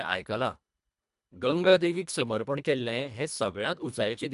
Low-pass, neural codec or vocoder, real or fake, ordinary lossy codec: 10.8 kHz; codec, 24 kHz, 1.5 kbps, HILCodec; fake; none